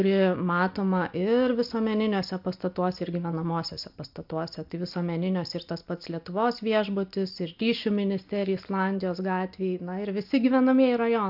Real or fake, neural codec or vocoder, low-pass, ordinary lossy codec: real; none; 5.4 kHz; AAC, 48 kbps